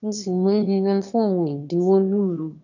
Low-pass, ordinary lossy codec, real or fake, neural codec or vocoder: 7.2 kHz; none; fake; autoencoder, 22.05 kHz, a latent of 192 numbers a frame, VITS, trained on one speaker